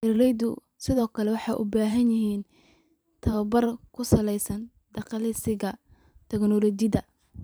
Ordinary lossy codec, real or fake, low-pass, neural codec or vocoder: none; fake; none; vocoder, 44.1 kHz, 128 mel bands every 512 samples, BigVGAN v2